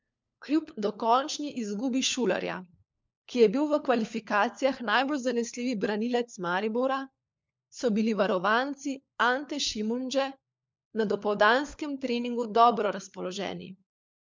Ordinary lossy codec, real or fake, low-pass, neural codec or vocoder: MP3, 64 kbps; fake; 7.2 kHz; codec, 16 kHz, 4 kbps, FunCodec, trained on LibriTTS, 50 frames a second